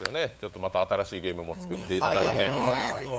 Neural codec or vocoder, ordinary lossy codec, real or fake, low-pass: codec, 16 kHz, 8 kbps, FunCodec, trained on LibriTTS, 25 frames a second; none; fake; none